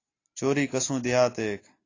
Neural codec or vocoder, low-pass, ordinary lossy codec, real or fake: none; 7.2 kHz; AAC, 32 kbps; real